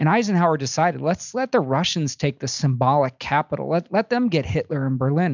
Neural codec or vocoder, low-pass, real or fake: none; 7.2 kHz; real